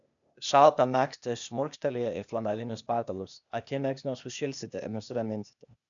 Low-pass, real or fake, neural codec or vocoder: 7.2 kHz; fake; codec, 16 kHz, 0.8 kbps, ZipCodec